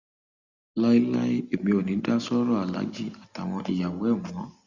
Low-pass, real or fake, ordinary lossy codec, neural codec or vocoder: 7.2 kHz; real; Opus, 64 kbps; none